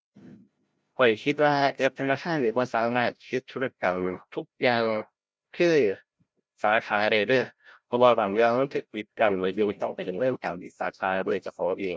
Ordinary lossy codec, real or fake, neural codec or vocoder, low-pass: none; fake; codec, 16 kHz, 0.5 kbps, FreqCodec, larger model; none